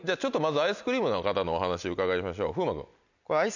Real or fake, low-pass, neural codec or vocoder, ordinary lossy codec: real; 7.2 kHz; none; none